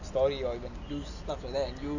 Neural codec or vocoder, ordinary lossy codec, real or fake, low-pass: none; none; real; 7.2 kHz